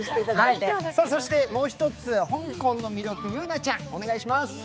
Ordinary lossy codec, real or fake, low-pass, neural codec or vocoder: none; fake; none; codec, 16 kHz, 4 kbps, X-Codec, HuBERT features, trained on balanced general audio